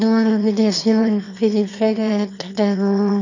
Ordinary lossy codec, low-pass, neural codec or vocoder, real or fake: none; 7.2 kHz; autoencoder, 22.05 kHz, a latent of 192 numbers a frame, VITS, trained on one speaker; fake